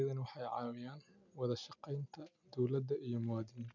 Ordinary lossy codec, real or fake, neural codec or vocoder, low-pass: none; real; none; 7.2 kHz